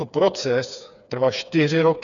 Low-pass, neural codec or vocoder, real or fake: 7.2 kHz; codec, 16 kHz, 4 kbps, FreqCodec, smaller model; fake